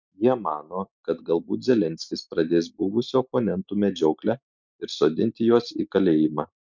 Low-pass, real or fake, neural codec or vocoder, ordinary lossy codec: 7.2 kHz; real; none; MP3, 64 kbps